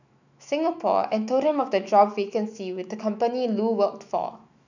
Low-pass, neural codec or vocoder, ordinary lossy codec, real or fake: 7.2 kHz; autoencoder, 48 kHz, 128 numbers a frame, DAC-VAE, trained on Japanese speech; none; fake